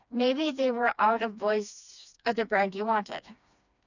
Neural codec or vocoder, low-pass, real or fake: codec, 16 kHz, 2 kbps, FreqCodec, smaller model; 7.2 kHz; fake